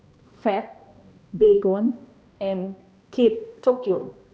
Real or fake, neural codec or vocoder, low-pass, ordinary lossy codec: fake; codec, 16 kHz, 1 kbps, X-Codec, HuBERT features, trained on balanced general audio; none; none